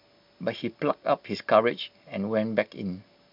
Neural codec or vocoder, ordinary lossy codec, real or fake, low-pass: none; none; real; 5.4 kHz